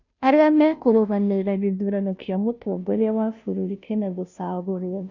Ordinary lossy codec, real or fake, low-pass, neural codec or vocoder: none; fake; 7.2 kHz; codec, 16 kHz, 0.5 kbps, FunCodec, trained on Chinese and English, 25 frames a second